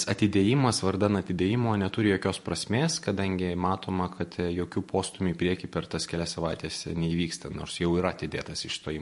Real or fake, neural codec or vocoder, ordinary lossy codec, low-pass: fake; vocoder, 44.1 kHz, 128 mel bands every 512 samples, BigVGAN v2; MP3, 48 kbps; 14.4 kHz